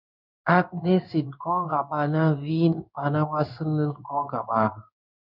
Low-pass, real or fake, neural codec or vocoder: 5.4 kHz; fake; codec, 16 kHz in and 24 kHz out, 1 kbps, XY-Tokenizer